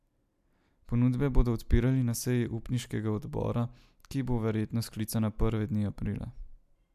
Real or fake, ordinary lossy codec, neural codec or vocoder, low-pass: real; MP3, 96 kbps; none; 14.4 kHz